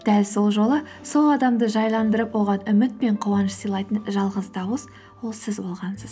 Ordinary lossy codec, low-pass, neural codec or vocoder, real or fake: none; none; none; real